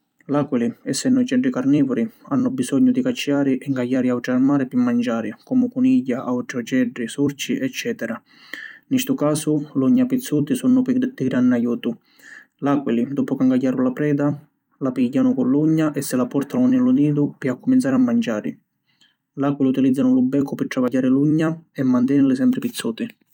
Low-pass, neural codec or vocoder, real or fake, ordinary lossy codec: 19.8 kHz; vocoder, 44.1 kHz, 128 mel bands every 256 samples, BigVGAN v2; fake; none